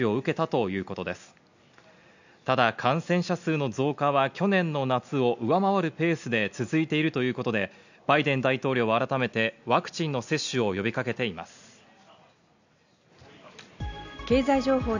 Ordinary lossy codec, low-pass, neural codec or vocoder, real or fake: none; 7.2 kHz; none; real